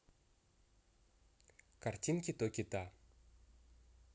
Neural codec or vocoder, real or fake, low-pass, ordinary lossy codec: none; real; none; none